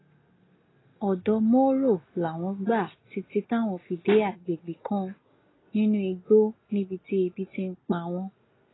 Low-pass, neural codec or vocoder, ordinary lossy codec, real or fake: 7.2 kHz; none; AAC, 16 kbps; real